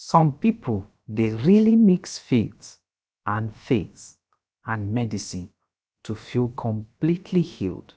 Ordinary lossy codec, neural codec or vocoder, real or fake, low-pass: none; codec, 16 kHz, about 1 kbps, DyCAST, with the encoder's durations; fake; none